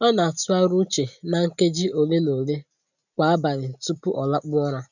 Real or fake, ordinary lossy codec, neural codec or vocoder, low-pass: real; none; none; 7.2 kHz